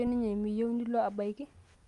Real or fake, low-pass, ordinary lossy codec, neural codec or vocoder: real; 10.8 kHz; Opus, 32 kbps; none